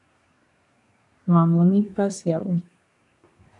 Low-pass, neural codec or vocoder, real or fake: 10.8 kHz; codec, 24 kHz, 1 kbps, SNAC; fake